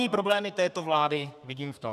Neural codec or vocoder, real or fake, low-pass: codec, 32 kHz, 1.9 kbps, SNAC; fake; 14.4 kHz